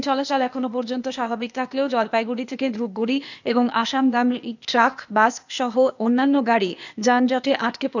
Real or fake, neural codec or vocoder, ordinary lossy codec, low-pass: fake; codec, 16 kHz, 0.8 kbps, ZipCodec; none; 7.2 kHz